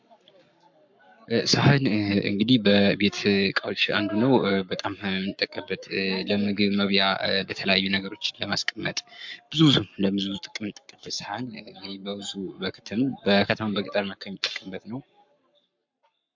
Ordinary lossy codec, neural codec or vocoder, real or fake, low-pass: MP3, 64 kbps; codec, 44.1 kHz, 7.8 kbps, Pupu-Codec; fake; 7.2 kHz